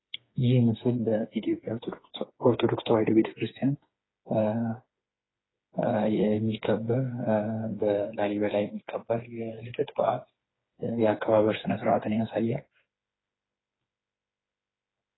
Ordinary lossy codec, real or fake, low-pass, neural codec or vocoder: AAC, 16 kbps; fake; 7.2 kHz; codec, 16 kHz, 4 kbps, FreqCodec, smaller model